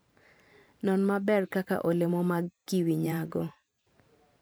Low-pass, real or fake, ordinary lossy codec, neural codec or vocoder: none; fake; none; vocoder, 44.1 kHz, 128 mel bands every 512 samples, BigVGAN v2